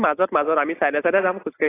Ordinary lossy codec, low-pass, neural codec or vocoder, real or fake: AAC, 16 kbps; 3.6 kHz; none; real